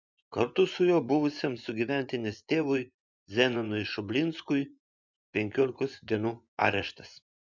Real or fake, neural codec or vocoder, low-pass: fake; vocoder, 24 kHz, 100 mel bands, Vocos; 7.2 kHz